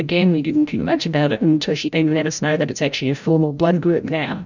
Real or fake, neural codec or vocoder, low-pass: fake; codec, 16 kHz, 0.5 kbps, FreqCodec, larger model; 7.2 kHz